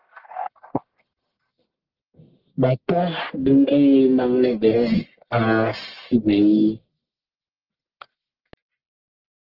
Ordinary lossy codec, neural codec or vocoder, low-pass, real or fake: Opus, 16 kbps; codec, 44.1 kHz, 1.7 kbps, Pupu-Codec; 5.4 kHz; fake